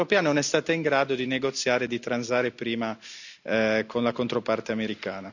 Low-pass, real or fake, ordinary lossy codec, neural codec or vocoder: 7.2 kHz; real; none; none